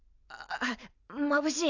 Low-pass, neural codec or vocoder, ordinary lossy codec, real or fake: 7.2 kHz; none; none; real